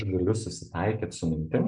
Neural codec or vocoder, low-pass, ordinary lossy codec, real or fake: none; 9.9 kHz; MP3, 96 kbps; real